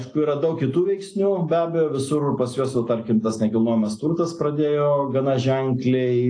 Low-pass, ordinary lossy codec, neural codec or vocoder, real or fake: 9.9 kHz; AAC, 48 kbps; none; real